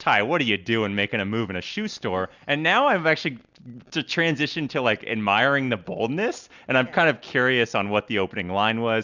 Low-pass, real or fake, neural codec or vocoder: 7.2 kHz; real; none